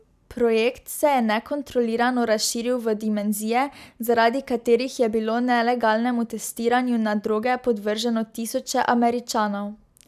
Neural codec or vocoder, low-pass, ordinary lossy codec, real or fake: none; 14.4 kHz; none; real